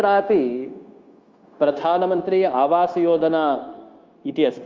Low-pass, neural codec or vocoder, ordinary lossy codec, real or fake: 7.2 kHz; codec, 16 kHz, 0.9 kbps, LongCat-Audio-Codec; Opus, 32 kbps; fake